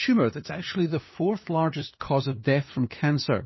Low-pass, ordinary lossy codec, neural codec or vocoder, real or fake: 7.2 kHz; MP3, 24 kbps; codec, 16 kHz, 2 kbps, FunCodec, trained on LibriTTS, 25 frames a second; fake